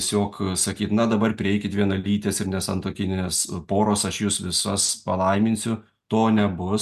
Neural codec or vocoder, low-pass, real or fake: none; 14.4 kHz; real